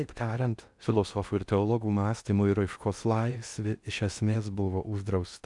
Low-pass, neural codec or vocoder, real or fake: 10.8 kHz; codec, 16 kHz in and 24 kHz out, 0.6 kbps, FocalCodec, streaming, 4096 codes; fake